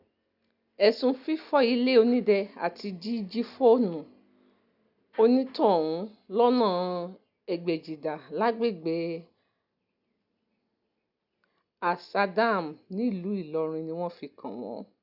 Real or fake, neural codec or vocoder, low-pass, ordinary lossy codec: real; none; 5.4 kHz; none